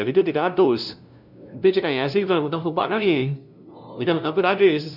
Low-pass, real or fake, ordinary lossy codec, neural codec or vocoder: 5.4 kHz; fake; none; codec, 16 kHz, 0.5 kbps, FunCodec, trained on LibriTTS, 25 frames a second